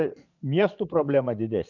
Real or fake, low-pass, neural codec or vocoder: fake; 7.2 kHz; vocoder, 22.05 kHz, 80 mel bands, Vocos